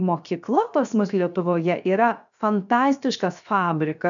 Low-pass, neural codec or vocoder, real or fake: 7.2 kHz; codec, 16 kHz, 0.7 kbps, FocalCodec; fake